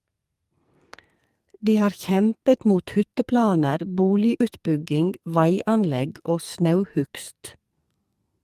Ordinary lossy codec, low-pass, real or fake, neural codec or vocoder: Opus, 32 kbps; 14.4 kHz; fake; codec, 44.1 kHz, 2.6 kbps, SNAC